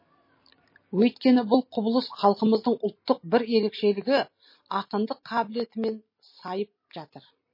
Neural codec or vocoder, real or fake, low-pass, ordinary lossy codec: none; real; 5.4 kHz; MP3, 24 kbps